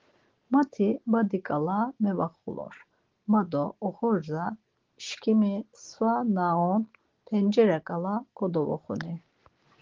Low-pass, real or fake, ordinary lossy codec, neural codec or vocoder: 7.2 kHz; real; Opus, 16 kbps; none